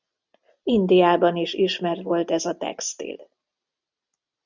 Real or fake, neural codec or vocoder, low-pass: real; none; 7.2 kHz